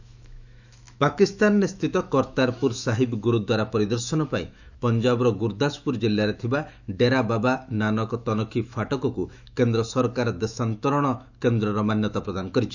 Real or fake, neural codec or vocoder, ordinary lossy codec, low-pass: fake; autoencoder, 48 kHz, 128 numbers a frame, DAC-VAE, trained on Japanese speech; none; 7.2 kHz